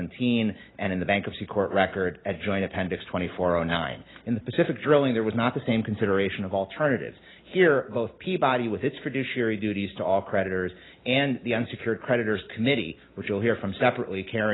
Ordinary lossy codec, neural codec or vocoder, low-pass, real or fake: AAC, 16 kbps; none; 7.2 kHz; real